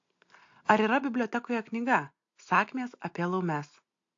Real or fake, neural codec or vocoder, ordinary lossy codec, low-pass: real; none; AAC, 48 kbps; 7.2 kHz